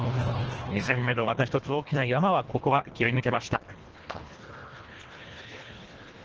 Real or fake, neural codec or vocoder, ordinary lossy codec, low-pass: fake; codec, 24 kHz, 1.5 kbps, HILCodec; Opus, 16 kbps; 7.2 kHz